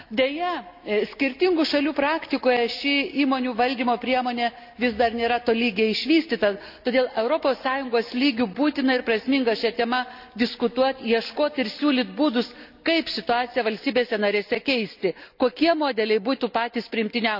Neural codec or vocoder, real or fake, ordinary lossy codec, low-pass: none; real; none; 5.4 kHz